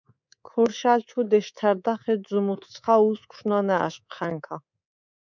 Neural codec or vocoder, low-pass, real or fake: codec, 24 kHz, 3.1 kbps, DualCodec; 7.2 kHz; fake